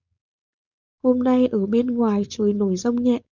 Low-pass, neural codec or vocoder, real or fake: 7.2 kHz; codec, 16 kHz, 4.8 kbps, FACodec; fake